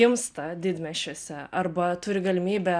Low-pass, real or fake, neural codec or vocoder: 9.9 kHz; real; none